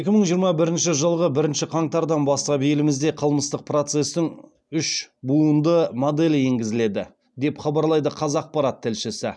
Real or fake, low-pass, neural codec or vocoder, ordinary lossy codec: real; 9.9 kHz; none; none